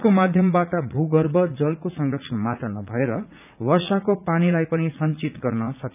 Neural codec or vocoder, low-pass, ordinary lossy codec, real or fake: vocoder, 44.1 kHz, 80 mel bands, Vocos; 3.6 kHz; none; fake